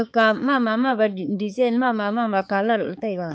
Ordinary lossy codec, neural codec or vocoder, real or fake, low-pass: none; codec, 16 kHz, 4 kbps, X-Codec, HuBERT features, trained on balanced general audio; fake; none